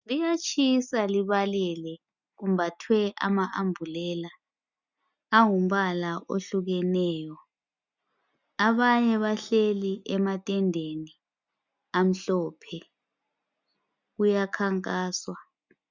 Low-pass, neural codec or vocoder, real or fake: 7.2 kHz; none; real